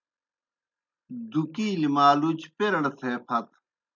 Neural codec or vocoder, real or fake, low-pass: none; real; 7.2 kHz